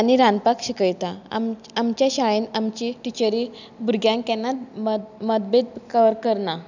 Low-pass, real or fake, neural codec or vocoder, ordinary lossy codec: 7.2 kHz; real; none; none